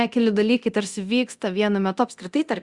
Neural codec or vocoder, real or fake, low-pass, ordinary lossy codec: codec, 24 kHz, 0.9 kbps, DualCodec; fake; 10.8 kHz; Opus, 64 kbps